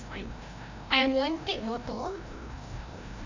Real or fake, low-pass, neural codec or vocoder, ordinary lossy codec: fake; 7.2 kHz; codec, 16 kHz, 1 kbps, FreqCodec, larger model; none